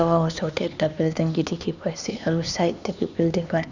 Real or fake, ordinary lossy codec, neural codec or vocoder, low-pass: fake; none; codec, 16 kHz, 2 kbps, X-Codec, HuBERT features, trained on LibriSpeech; 7.2 kHz